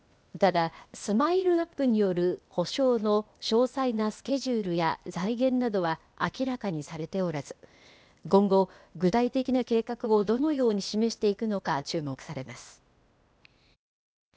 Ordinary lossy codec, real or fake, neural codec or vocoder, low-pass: none; fake; codec, 16 kHz, 0.8 kbps, ZipCodec; none